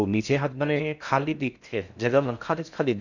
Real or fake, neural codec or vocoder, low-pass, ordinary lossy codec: fake; codec, 16 kHz in and 24 kHz out, 0.6 kbps, FocalCodec, streaming, 4096 codes; 7.2 kHz; none